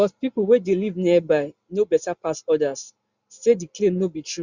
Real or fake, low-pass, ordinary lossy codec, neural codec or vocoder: real; 7.2 kHz; Opus, 64 kbps; none